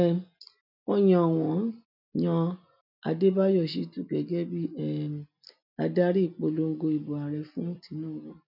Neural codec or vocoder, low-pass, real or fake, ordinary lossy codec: none; 5.4 kHz; real; none